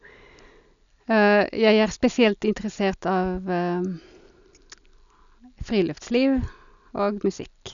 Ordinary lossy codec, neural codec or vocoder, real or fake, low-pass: none; none; real; 7.2 kHz